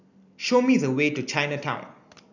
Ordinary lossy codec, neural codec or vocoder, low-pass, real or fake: none; none; 7.2 kHz; real